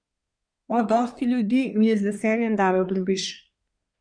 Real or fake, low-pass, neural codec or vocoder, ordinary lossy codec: fake; 9.9 kHz; codec, 24 kHz, 1 kbps, SNAC; none